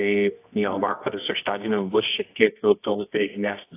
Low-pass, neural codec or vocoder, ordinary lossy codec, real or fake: 3.6 kHz; codec, 24 kHz, 0.9 kbps, WavTokenizer, medium music audio release; AAC, 24 kbps; fake